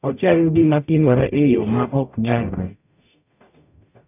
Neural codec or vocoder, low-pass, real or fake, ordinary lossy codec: codec, 44.1 kHz, 0.9 kbps, DAC; 3.6 kHz; fake; none